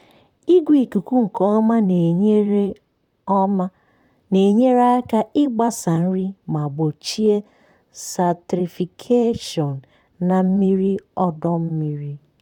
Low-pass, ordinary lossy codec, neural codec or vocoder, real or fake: 19.8 kHz; none; vocoder, 44.1 kHz, 128 mel bands, Pupu-Vocoder; fake